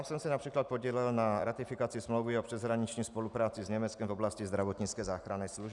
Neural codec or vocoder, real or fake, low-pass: none; real; 10.8 kHz